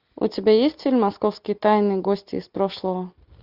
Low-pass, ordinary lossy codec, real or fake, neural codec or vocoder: 5.4 kHz; Opus, 64 kbps; real; none